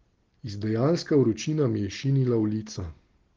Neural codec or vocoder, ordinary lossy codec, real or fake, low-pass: none; Opus, 16 kbps; real; 7.2 kHz